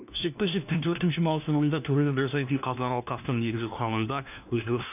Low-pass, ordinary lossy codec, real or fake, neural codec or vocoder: 3.6 kHz; none; fake; codec, 16 kHz, 1 kbps, FunCodec, trained on LibriTTS, 50 frames a second